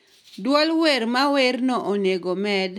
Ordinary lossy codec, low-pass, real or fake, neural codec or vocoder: none; 19.8 kHz; real; none